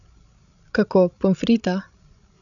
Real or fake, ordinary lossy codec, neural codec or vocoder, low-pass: fake; none; codec, 16 kHz, 16 kbps, FreqCodec, larger model; 7.2 kHz